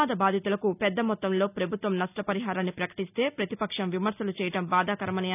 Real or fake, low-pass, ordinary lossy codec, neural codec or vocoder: real; 3.6 kHz; none; none